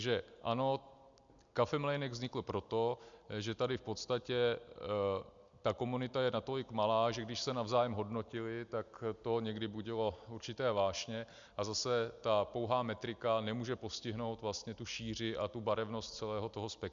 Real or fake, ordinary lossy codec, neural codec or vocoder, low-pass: real; MP3, 64 kbps; none; 7.2 kHz